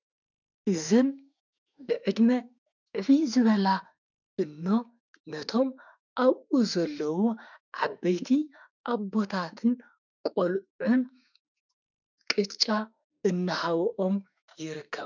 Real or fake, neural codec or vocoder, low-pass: fake; autoencoder, 48 kHz, 32 numbers a frame, DAC-VAE, trained on Japanese speech; 7.2 kHz